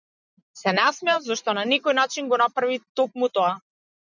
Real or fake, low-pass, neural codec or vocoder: real; 7.2 kHz; none